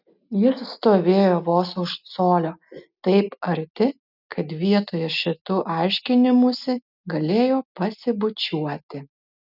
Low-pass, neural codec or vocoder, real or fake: 5.4 kHz; none; real